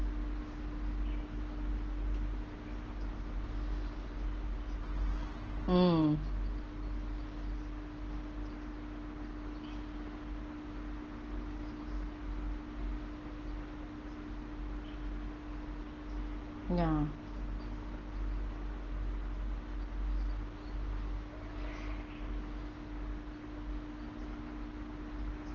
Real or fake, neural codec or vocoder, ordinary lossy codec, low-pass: real; none; Opus, 16 kbps; 7.2 kHz